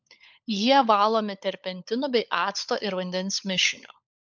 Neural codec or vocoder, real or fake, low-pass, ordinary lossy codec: codec, 16 kHz, 16 kbps, FunCodec, trained on LibriTTS, 50 frames a second; fake; 7.2 kHz; MP3, 64 kbps